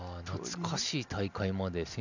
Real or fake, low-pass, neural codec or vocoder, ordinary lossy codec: real; 7.2 kHz; none; none